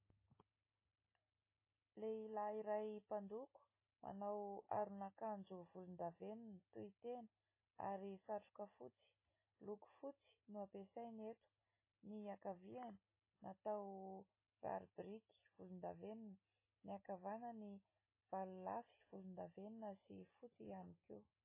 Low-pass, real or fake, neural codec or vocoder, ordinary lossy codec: 3.6 kHz; real; none; MP3, 16 kbps